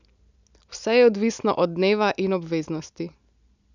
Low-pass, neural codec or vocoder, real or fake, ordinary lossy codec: 7.2 kHz; none; real; none